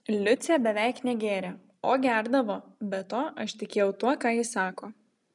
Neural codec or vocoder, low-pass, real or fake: none; 10.8 kHz; real